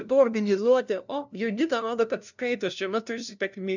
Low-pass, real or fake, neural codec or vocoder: 7.2 kHz; fake; codec, 16 kHz, 0.5 kbps, FunCodec, trained on LibriTTS, 25 frames a second